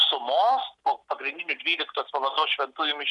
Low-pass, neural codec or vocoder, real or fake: 10.8 kHz; none; real